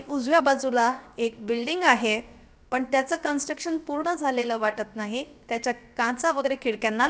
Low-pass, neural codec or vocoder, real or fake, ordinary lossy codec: none; codec, 16 kHz, 0.7 kbps, FocalCodec; fake; none